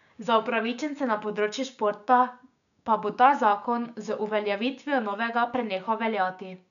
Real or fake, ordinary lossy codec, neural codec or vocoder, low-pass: fake; none; codec, 16 kHz, 6 kbps, DAC; 7.2 kHz